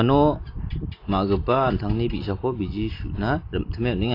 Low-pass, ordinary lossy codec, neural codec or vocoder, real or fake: 5.4 kHz; AAC, 32 kbps; none; real